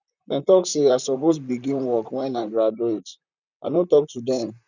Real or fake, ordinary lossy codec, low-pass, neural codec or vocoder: fake; none; 7.2 kHz; vocoder, 44.1 kHz, 128 mel bands, Pupu-Vocoder